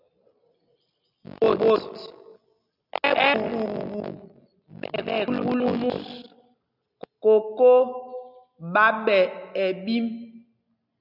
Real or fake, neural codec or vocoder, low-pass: real; none; 5.4 kHz